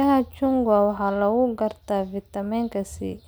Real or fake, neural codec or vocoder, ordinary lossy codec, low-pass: real; none; none; none